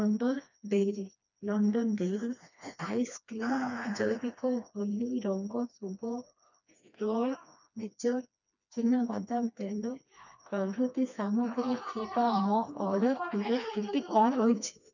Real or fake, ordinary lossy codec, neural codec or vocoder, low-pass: fake; none; codec, 16 kHz, 2 kbps, FreqCodec, smaller model; 7.2 kHz